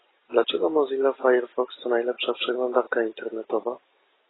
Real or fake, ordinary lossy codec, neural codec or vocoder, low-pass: real; AAC, 16 kbps; none; 7.2 kHz